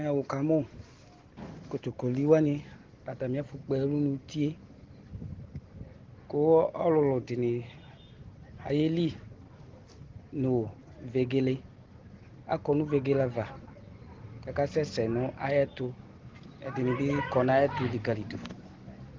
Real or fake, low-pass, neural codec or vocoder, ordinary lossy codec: real; 7.2 kHz; none; Opus, 16 kbps